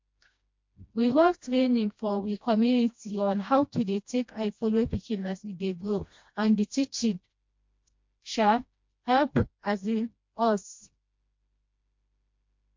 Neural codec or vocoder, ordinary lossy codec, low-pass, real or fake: codec, 16 kHz, 1 kbps, FreqCodec, smaller model; MP3, 48 kbps; 7.2 kHz; fake